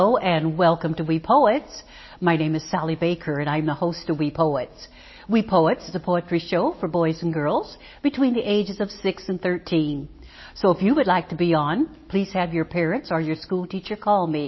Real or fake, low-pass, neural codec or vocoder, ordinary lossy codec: real; 7.2 kHz; none; MP3, 24 kbps